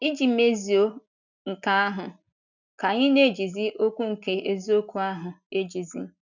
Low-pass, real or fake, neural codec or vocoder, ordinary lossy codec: 7.2 kHz; real; none; none